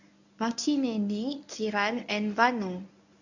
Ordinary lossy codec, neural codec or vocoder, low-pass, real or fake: none; codec, 24 kHz, 0.9 kbps, WavTokenizer, medium speech release version 1; 7.2 kHz; fake